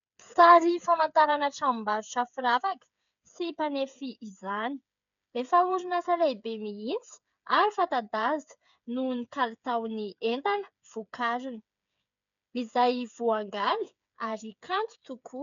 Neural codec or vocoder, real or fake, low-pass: codec, 16 kHz, 8 kbps, FreqCodec, smaller model; fake; 7.2 kHz